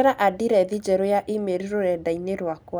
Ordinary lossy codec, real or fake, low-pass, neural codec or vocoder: none; real; none; none